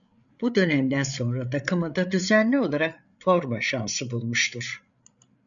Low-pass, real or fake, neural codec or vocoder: 7.2 kHz; fake; codec, 16 kHz, 8 kbps, FreqCodec, larger model